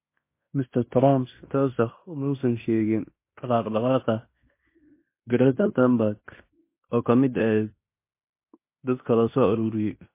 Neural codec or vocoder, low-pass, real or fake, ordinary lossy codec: codec, 16 kHz in and 24 kHz out, 0.9 kbps, LongCat-Audio-Codec, fine tuned four codebook decoder; 3.6 kHz; fake; MP3, 24 kbps